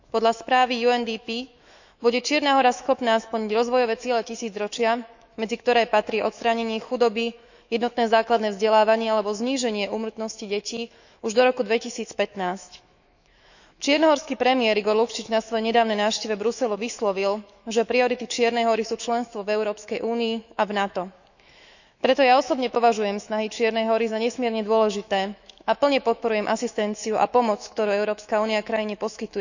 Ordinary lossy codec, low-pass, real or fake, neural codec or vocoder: none; 7.2 kHz; fake; autoencoder, 48 kHz, 128 numbers a frame, DAC-VAE, trained on Japanese speech